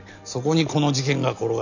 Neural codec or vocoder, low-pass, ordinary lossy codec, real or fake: none; 7.2 kHz; none; real